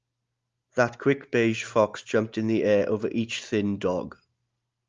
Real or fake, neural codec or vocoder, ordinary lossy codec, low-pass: real; none; Opus, 32 kbps; 7.2 kHz